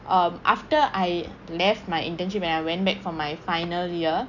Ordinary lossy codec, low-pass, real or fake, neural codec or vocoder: none; 7.2 kHz; real; none